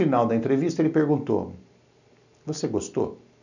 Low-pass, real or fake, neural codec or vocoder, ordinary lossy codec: 7.2 kHz; real; none; none